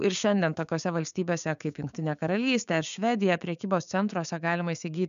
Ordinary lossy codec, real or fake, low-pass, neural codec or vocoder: MP3, 96 kbps; fake; 7.2 kHz; codec, 16 kHz, 6 kbps, DAC